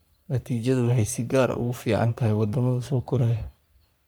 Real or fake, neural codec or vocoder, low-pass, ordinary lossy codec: fake; codec, 44.1 kHz, 3.4 kbps, Pupu-Codec; none; none